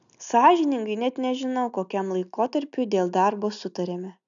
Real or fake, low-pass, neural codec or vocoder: real; 7.2 kHz; none